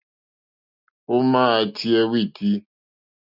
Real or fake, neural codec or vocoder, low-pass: real; none; 5.4 kHz